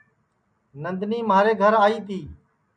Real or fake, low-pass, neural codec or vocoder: real; 9.9 kHz; none